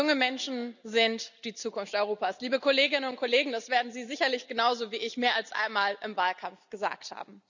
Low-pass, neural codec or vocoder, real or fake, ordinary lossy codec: 7.2 kHz; none; real; none